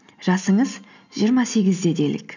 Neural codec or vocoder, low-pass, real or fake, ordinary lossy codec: none; 7.2 kHz; real; none